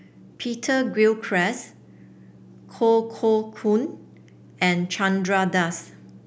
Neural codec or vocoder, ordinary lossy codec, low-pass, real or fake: none; none; none; real